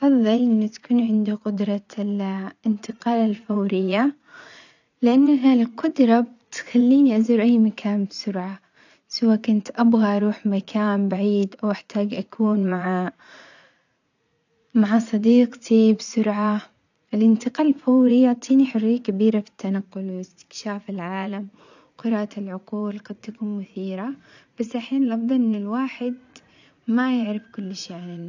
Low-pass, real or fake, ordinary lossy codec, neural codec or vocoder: 7.2 kHz; fake; none; vocoder, 44.1 kHz, 80 mel bands, Vocos